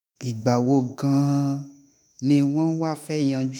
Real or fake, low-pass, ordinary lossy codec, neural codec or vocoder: fake; none; none; autoencoder, 48 kHz, 32 numbers a frame, DAC-VAE, trained on Japanese speech